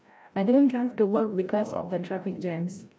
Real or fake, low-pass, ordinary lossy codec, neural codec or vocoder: fake; none; none; codec, 16 kHz, 0.5 kbps, FreqCodec, larger model